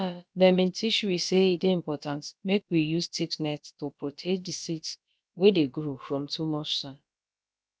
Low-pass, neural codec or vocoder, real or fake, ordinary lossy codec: none; codec, 16 kHz, about 1 kbps, DyCAST, with the encoder's durations; fake; none